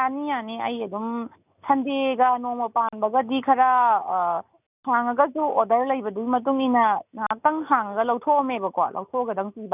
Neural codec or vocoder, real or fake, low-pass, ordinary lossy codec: none; real; 3.6 kHz; none